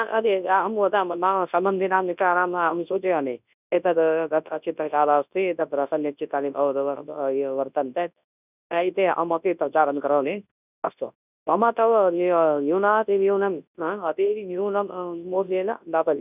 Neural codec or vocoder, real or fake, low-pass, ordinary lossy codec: codec, 24 kHz, 0.9 kbps, WavTokenizer, large speech release; fake; 3.6 kHz; none